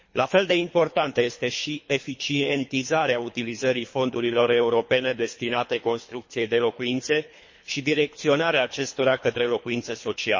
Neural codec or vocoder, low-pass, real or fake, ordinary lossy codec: codec, 24 kHz, 3 kbps, HILCodec; 7.2 kHz; fake; MP3, 32 kbps